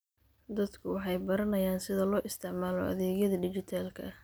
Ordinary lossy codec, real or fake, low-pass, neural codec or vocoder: none; real; none; none